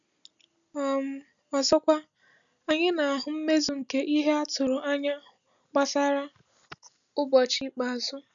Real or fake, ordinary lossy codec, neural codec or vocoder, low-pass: real; none; none; 7.2 kHz